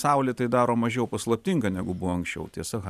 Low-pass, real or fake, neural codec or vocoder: 14.4 kHz; fake; vocoder, 44.1 kHz, 128 mel bands every 512 samples, BigVGAN v2